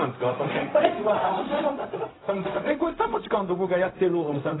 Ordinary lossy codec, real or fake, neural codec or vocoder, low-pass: AAC, 16 kbps; fake; codec, 16 kHz, 0.4 kbps, LongCat-Audio-Codec; 7.2 kHz